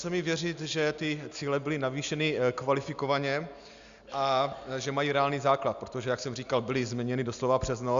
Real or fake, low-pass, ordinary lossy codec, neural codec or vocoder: real; 7.2 kHz; Opus, 64 kbps; none